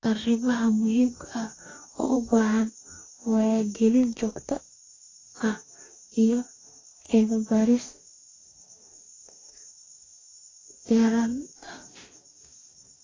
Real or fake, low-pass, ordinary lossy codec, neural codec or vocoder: fake; 7.2 kHz; AAC, 32 kbps; codec, 44.1 kHz, 2.6 kbps, DAC